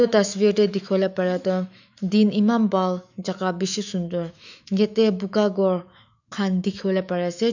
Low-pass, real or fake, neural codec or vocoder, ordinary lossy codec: 7.2 kHz; fake; autoencoder, 48 kHz, 128 numbers a frame, DAC-VAE, trained on Japanese speech; none